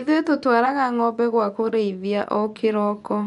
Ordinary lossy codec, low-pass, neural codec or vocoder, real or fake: none; 10.8 kHz; vocoder, 24 kHz, 100 mel bands, Vocos; fake